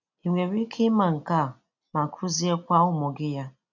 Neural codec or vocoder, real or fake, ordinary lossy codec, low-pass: none; real; none; 7.2 kHz